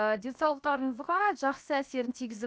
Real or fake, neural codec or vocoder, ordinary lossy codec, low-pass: fake; codec, 16 kHz, 0.7 kbps, FocalCodec; none; none